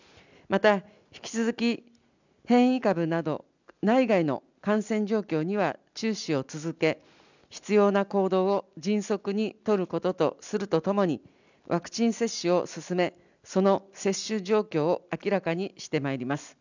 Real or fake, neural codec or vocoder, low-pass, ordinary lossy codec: real; none; 7.2 kHz; none